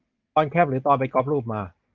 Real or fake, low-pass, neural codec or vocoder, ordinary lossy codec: real; 7.2 kHz; none; Opus, 24 kbps